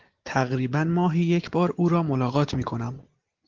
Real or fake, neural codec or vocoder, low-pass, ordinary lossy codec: real; none; 7.2 kHz; Opus, 16 kbps